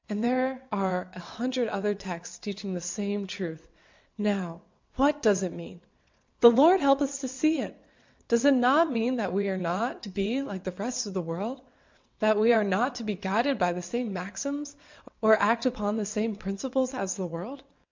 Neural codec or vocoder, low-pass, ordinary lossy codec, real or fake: vocoder, 22.05 kHz, 80 mel bands, WaveNeXt; 7.2 kHz; MP3, 64 kbps; fake